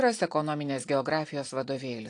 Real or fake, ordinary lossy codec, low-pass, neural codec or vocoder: real; AAC, 48 kbps; 9.9 kHz; none